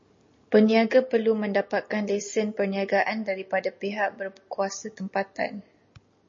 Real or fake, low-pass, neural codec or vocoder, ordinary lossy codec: real; 7.2 kHz; none; MP3, 32 kbps